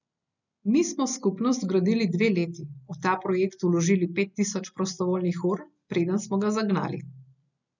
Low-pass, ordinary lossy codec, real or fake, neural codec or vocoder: 7.2 kHz; none; real; none